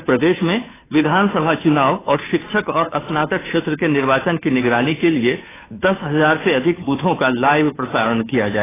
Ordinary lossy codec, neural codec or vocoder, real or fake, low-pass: AAC, 16 kbps; codec, 16 kHz, 2 kbps, FunCodec, trained on Chinese and English, 25 frames a second; fake; 3.6 kHz